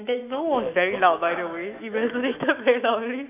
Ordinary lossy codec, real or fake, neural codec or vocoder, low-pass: none; fake; autoencoder, 48 kHz, 128 numbers a frame, DAC-VAE, trained on Japanese speech; 3.6 kHz